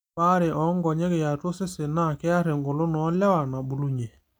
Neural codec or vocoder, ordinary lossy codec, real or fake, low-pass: none; none; real; none